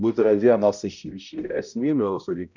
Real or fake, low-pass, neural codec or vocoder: fake; 7.2 kHz; codec, 16 kHz, 0.5 kbps, X-Codec, HuBERT features, trained on balanced general audio